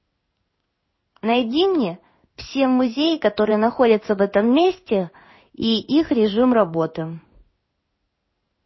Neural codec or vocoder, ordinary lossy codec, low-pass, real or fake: codec, 16 kHz in and 24 kHz out, 1 kbps, XY-Tokenizer; MP3, 24 kbps; 7.2 kHz; fake